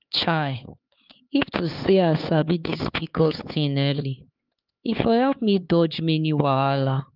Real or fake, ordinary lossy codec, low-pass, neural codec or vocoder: fake; Opus, 32 kbps; 5.4 kHz; codec, 16 kHz, 4 kbps, X-Codec, HuBERT features, trained on LibriSpeech